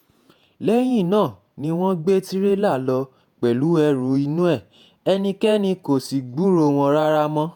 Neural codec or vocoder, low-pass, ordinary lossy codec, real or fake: vocoder, 48 kHz, 128 mel bands, Vocos; 19.8 kHz; none; fake